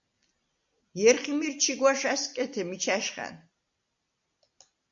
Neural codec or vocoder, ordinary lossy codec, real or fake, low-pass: none; AAC, 64 kbps; real; 7.2 kHz